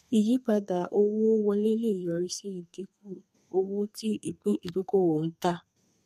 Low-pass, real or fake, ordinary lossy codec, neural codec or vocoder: 14.4 kHz; fake; MP3, 64 kbps; codec, 32 kHz, 1.9 kbps, SNAC